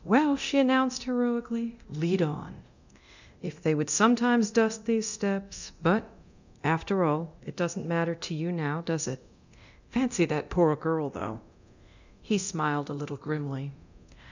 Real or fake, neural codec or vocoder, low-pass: fake; codec, 24 kHz, 0.9 kbps, DualCodec; 7.2 kHz